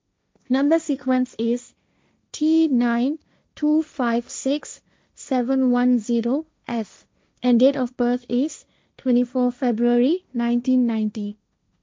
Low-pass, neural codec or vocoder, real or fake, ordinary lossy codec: none; codec, 16 kHz, 1.1 kbps, Voila-Tokenizer; fake; none